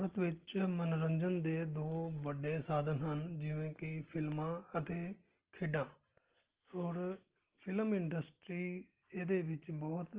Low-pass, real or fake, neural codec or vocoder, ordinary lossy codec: 3.6 kHz; real; none; Opus, 16 kbps